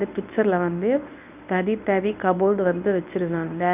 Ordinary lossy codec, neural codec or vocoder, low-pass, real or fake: none; codec, 24 kHz, 0.9 kbps, WavTokenizer, medium speech release version 1; 3.6 kHz; fake